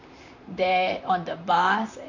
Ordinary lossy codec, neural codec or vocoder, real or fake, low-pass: none; vocoder, 44.1 kHz, 128 mel bands, Pupu-Vocoder; fake; 7.2 kHz